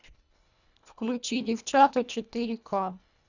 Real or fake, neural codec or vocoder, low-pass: fake; codec, 24 kHz, 1.5 kbps, HILCodec; 7.2 kHz